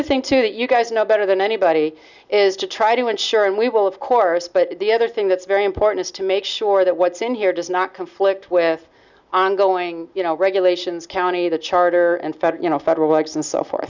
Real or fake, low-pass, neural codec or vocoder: real; 7.2 kHz; none